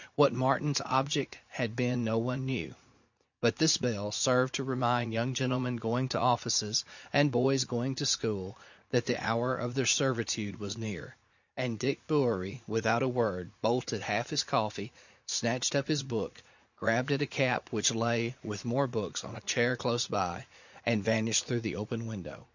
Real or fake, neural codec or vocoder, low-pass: fake; vocoder, 44.1 kHz, 80 mel bands, Vocos; 7.2 kHz